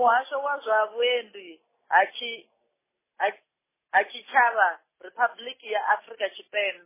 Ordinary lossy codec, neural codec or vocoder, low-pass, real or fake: MP3, 16 kbps; none; 3.6 kHz; real